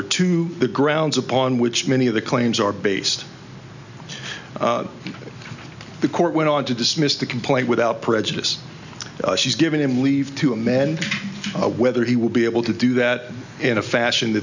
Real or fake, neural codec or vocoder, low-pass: real; none; 7.2 kHz